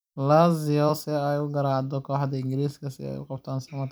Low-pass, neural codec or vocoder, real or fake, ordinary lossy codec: none; vocoder, 44.1 kHz, 128 mel bands every 256 samples, BigVGAN v2; fake; none